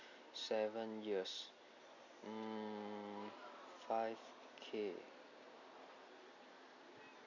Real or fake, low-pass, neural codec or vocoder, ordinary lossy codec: real; 7.2 kHz; none; none